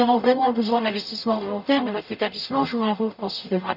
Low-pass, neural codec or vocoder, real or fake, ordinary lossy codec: 5.4 kHz; codec, 44.1 kHz, 0.9 kbps, DAC; fake; none